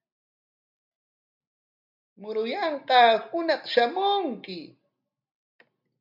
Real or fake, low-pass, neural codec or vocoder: real; 5.4 kHz; none